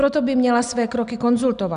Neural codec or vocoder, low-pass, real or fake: none; 9.9 kHz; real